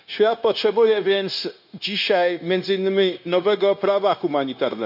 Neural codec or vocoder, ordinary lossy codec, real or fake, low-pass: codec, 16 kHz, 0.9 kbps, LongCat-Audio-Codec; none; fake; 5.4 kHz